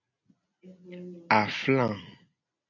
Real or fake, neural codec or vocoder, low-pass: real; none; 7.2 kHz